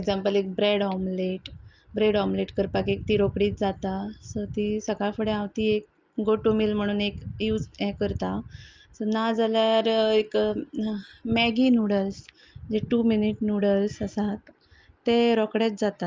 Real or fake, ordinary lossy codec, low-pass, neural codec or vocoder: real; Opus, 24 kbps; 7.2 kHz; none